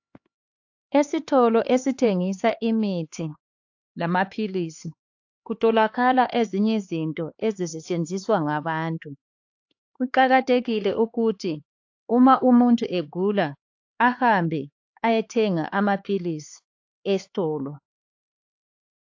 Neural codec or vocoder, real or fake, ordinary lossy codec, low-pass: codec, 16 kHz, 4 kbps, X-Codec, HuBERT features, trained on LibriSpeech; fake; AAC, 48 kbps; 7.2 kHz